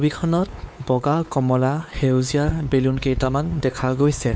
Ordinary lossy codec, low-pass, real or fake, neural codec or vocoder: none; none; fake; codec, 16 kHz, 4 kbps, X-Codec, WavLM features, trained on Multilingual LibriSpeech